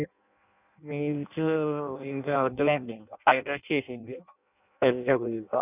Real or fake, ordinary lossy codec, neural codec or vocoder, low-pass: fake; none; codec, 16 kHz in and 24 kHz out, 0.6 kbps, FireRedTTS-2 codec; 3.6 kHz